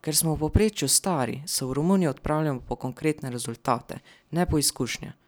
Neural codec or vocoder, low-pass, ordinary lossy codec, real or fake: none; none; none; real